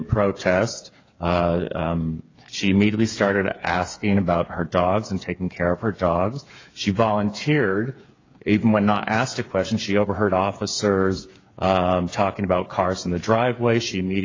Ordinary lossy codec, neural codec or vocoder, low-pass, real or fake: AAC, 32 kbps; codec, 16 kHz, 8 kbps, FreqCodec, smaller model; 7.2 kHz; fake